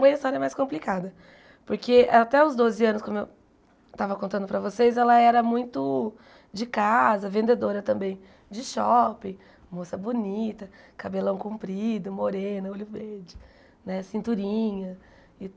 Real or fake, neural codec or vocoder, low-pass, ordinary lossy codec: real; none; none; none